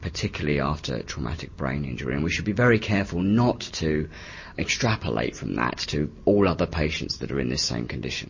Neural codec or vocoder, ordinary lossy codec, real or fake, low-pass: none; MP3, 32 kbps; real; 7.2 kHz